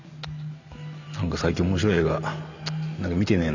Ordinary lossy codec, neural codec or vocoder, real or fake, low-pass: none; none; real; 7.2 kHz